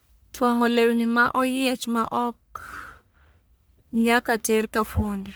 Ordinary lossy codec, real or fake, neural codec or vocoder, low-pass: none; fake; codec, 44.1 kHz, 1.7 kbps, Pupu-Codec; none